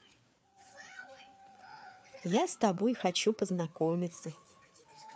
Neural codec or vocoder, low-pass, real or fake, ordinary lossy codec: codec, 16 kHz, 4 kbps, FreqCodec, larger model; none; fake; none